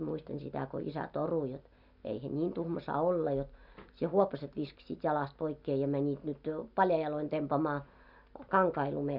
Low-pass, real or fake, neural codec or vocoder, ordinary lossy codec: 5.4 kHz; real; none; none